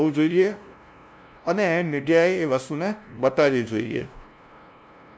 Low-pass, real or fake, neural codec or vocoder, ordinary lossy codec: none; fake; codec, 16 kHz, 0.5 kbps, FunCodec, trained on LibriTTS, 25 frames a second; none